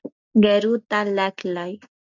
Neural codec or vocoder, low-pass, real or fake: none; 7.2 kHz; real